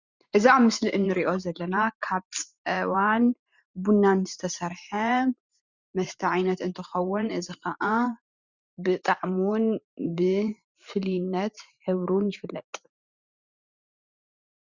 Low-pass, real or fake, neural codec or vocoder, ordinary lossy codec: 7.2 kHz; fake; vocoder, 44.1 kHz, 128 mel bands every 512 samples, BigVGAN v2; Opus, 64 kbps